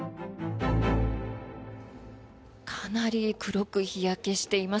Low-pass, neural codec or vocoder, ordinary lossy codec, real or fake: none; none; none; real